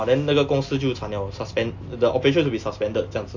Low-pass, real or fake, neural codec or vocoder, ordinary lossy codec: 7.2 kHz; real; none; AAC, 48 kbps